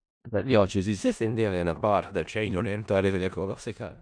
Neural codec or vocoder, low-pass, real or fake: codec, 16 kHz in and 24 kHz out, 0.4 kbps, LongCat-Audio-Codec, four codebook decoder; 9.9 kHz; fake